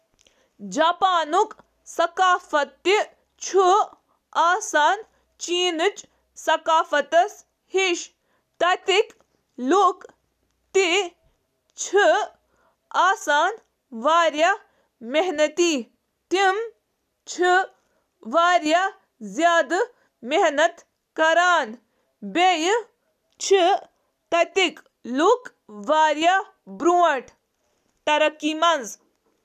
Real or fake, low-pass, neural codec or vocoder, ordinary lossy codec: real; 14.4 kHz; none; none